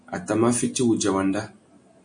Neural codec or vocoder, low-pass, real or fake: none; 9.9 kHz; real